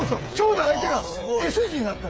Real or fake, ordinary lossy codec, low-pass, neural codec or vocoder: fake; none; none; codec, 16 kHz, 8 kbps, FreqCodec, smaller model